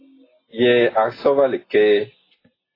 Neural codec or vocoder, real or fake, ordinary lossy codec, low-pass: none; real; AAC, 24 kbps; 5.4 kHz